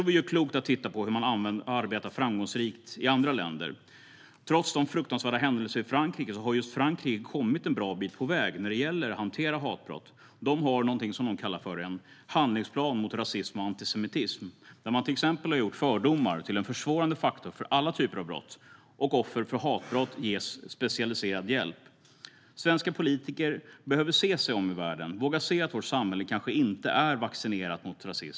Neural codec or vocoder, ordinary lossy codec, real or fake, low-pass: none; none; real; none